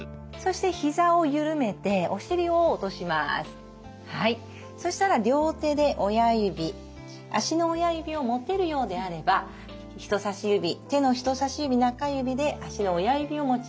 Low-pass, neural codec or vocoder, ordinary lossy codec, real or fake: none; none; none; real